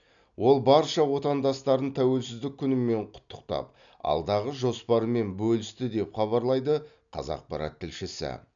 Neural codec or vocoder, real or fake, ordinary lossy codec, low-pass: none; real; none; 7.2 kHz